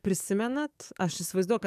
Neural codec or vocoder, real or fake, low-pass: codec, 44.1 kHz, 7.8 kbps, DAC; fake; 14.4 kHz